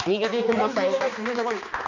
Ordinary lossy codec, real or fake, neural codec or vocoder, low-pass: none; fake; codec, 16 kHz, 2 kbps, X-Codec, HuBERT features, trained on balanced general audio; 7.2 kHz